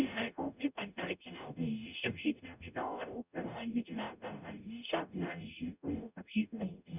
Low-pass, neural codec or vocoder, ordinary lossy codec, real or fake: 3.6 kHz; codec, 44.1 kHz, 0.9 kbps, DAC; none; fake